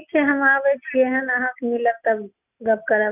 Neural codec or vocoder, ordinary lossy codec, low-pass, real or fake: none; none; 3.6 kHz; real